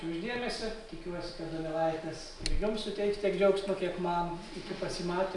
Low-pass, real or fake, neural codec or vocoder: 10.8 kHz; real; none